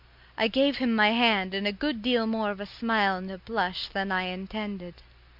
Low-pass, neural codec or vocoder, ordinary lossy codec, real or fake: 5.4 kHz; none; MP3, 48 kbps; real